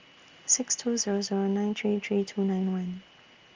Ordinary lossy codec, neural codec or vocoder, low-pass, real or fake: Opus, 32 kbps; none; 7.2 kHz; real